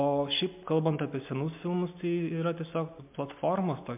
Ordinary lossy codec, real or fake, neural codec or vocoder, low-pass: MP3, 32 kbps; real; none; 3.6 kHz